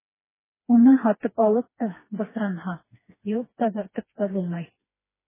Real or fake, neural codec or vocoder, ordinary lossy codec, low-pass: fake; codec, 16 kHz, 2 kbps, FreqCodec, smaller model; MP3, 16 kbps; 3.6 kHz